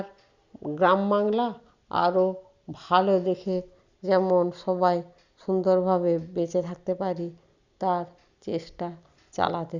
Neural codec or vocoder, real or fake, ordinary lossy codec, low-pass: none; real; Opus, 64 kbps; 7.2 kHz